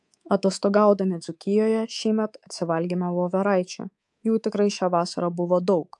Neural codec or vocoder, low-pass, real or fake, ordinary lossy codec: codec, 24 kHz, 3.1 kbps, DualCodec; 10.8 kHz; fake; AAC, 64 kbps